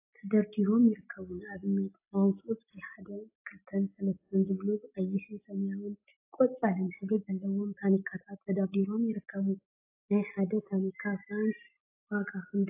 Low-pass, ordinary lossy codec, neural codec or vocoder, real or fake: 3.6 kHz; AAC, 32 kbps; none; real